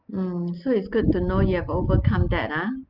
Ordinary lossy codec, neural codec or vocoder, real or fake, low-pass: Opus, 32 kbps; none; real; 5.4 kHz